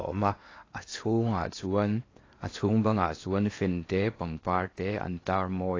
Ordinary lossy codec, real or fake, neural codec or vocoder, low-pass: AAC, 32 kbps; fake; codec, 16 kHz in and 24 kHz out, 0.8 kbps, FocalCodec, streaming, 65536 codes; 7.2 kHz